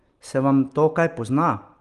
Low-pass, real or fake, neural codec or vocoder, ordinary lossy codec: 10.8 kHz; real; none; Opus, 24 kbps